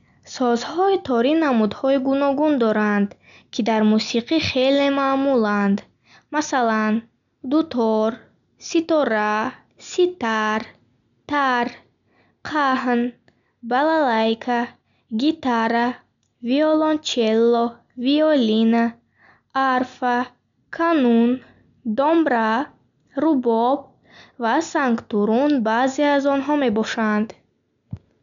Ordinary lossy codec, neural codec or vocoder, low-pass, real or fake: none; none; 7.2 kHz; real